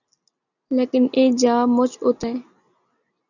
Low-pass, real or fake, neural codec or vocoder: 7.2 kHz; real; none